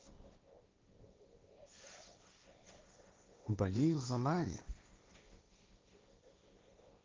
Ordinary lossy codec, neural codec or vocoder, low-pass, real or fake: Opus, 24 kbps; codec, 16 kHz, 1.1 kbps, Voila-Tokenizer; 7.2 kHz; fake